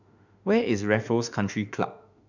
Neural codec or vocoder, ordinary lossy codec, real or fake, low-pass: autoencoder, 48 kHz, 32 numbers a frame, DAC-VAE, trained on Japanese speech; none; fake; 7.2 kHz